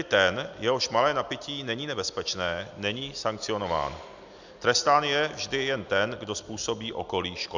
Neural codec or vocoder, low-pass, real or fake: none; 7.2 kHz; real